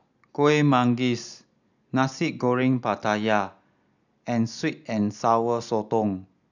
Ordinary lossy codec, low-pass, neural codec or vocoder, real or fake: none; 7.2 kHz; none; real